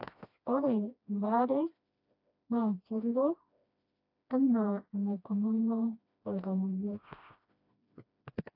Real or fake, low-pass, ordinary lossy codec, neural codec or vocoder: fake; 5.4 kHz; AAC, 48 kbps; codec, 16 kHz, 1 kbps, FreqCodec, smaller model